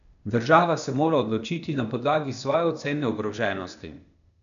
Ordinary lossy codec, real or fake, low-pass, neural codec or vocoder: none; fake; 7.2 kHz; codec, 16 kHz, 0.8 kbps, ZipCodec